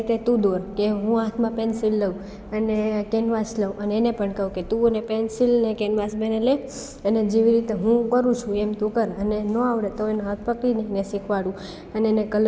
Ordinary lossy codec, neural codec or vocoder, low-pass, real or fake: none; none; none; real